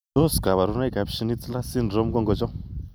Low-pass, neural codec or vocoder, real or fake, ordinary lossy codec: none; none; real; none